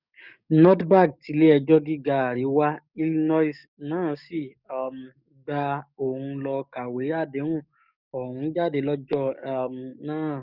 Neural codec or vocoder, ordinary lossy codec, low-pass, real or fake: codec, 44.1 kHz, 7.8 kbps, DAC; none; 5.4 kHz; fake